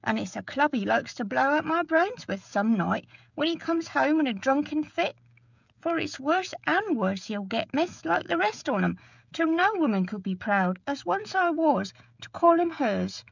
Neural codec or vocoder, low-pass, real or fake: codec, 16 kHz, 16 kbps, FreqCodec, smaller model; 7.2 kHz; fake